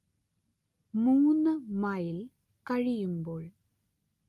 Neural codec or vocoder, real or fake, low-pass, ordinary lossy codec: none; real; 14.4 kHz; Opus, 32 kbps